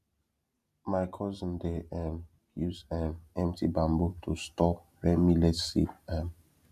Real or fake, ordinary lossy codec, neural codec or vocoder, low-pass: real; none; none; 14.4 kHz